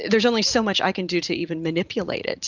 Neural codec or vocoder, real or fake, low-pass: none; real; 7.2 kHz